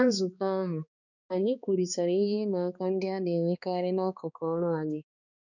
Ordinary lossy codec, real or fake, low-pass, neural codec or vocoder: none; fake; 7.2 kHz; codec, 16 kHz, 2 kbps, X-Codec, HuBERT features, trained on balanced general audio